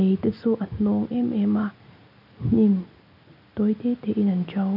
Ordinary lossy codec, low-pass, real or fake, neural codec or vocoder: none; 5.4 kHz; real; none